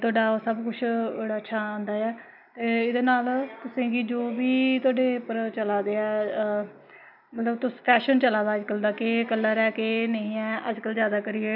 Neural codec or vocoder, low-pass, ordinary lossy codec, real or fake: none; 5.4 kHz; none; real